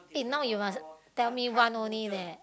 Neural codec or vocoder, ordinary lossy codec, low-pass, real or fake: none; none; none; real